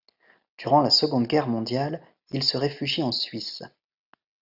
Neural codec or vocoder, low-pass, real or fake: none; 5.4 kHz; real